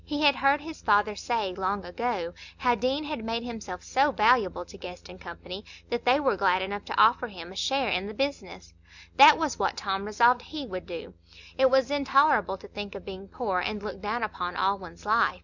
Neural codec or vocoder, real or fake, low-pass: none; real; 7.2 kHz